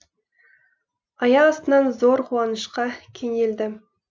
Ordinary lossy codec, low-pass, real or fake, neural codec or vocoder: none; none; real; none